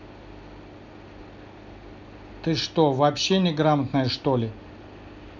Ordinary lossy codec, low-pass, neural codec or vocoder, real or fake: none; 7.2 kHz; none; real